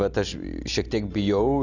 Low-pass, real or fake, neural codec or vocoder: 7.2 kHz; real; none